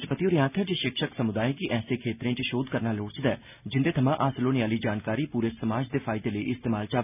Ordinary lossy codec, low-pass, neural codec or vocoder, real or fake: none; 3.6 kHz; none; real